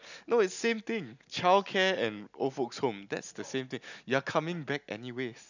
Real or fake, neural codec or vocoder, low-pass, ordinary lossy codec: real; none; 7.2 kHz; none